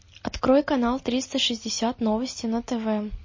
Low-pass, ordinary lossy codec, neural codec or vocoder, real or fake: 7.2 kHz; MP3, 32 kbps; none; real